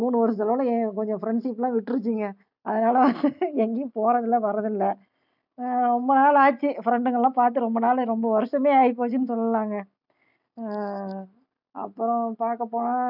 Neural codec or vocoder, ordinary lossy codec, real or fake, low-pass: none; none; real; 5.4 kHz